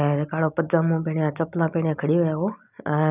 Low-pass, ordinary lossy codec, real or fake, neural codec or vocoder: 3.6 kHz; none; real; none